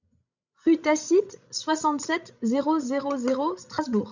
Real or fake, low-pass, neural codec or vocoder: fake; 7.2 kHz; codec, 16 kHz, 16 kbps, FreqCodec, larger model